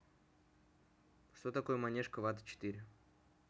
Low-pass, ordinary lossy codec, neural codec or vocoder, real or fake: none; none; none; real